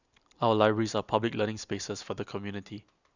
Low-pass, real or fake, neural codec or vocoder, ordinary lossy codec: 7.2 kHz; real; none; none